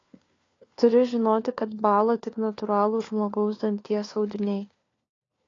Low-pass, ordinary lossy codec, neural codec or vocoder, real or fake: 7.2 kHz; AAC, 32 kbps; codec, 16 kHz, 4 kbps, FunCodec, trained on LibriTTS, 50 frames a second; fake